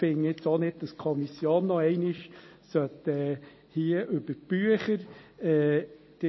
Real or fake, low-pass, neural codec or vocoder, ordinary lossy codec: real; 7.2 kHz; none; MP3, 24 kbps